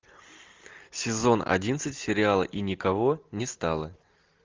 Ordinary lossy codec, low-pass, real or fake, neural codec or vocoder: Opus, 24 kbps; 7.2 kHz; real; none